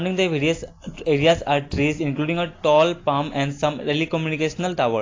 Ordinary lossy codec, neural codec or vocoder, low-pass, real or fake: AAC, 32 kbps; none; 7.2 kHz; real